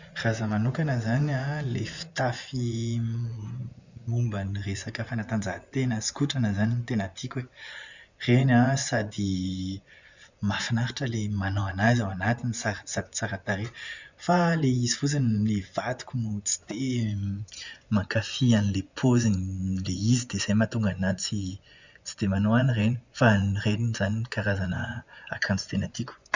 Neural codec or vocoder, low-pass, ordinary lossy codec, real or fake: vocoder, 24 kHz, 100 mel bands, Vocos; 7.2 kHz; Opus, 64 kbps; fake